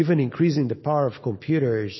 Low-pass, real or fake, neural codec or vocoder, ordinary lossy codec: 7.2 kHz; real; none; MP3, 24 kbps